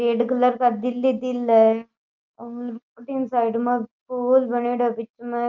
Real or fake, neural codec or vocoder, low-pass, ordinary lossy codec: real; none; 7.2 kHz; Opus, 24 kbps